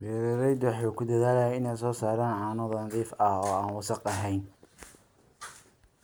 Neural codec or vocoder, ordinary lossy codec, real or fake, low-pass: none; none; real; none